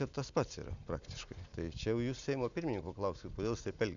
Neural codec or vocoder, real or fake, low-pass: none; real; 7.2 kHz